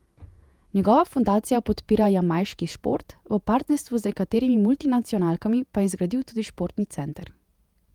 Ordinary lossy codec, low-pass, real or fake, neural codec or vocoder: Opus, 32 kbps; 19.8 kHz; fake; vocoder, 48 kHz, 128 mel bands, Vocos